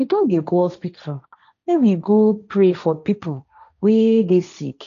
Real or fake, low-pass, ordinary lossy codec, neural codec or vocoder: fake; 7.2 kHz; none; codec, 16 kHz, 1.1 kbps, Voila-Tokenizer